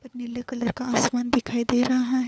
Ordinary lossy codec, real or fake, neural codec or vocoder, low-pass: none; fake; codec, 16 kHz, 4 kbps, FunCodec, trained on LibriTTS, 50 frames a second; none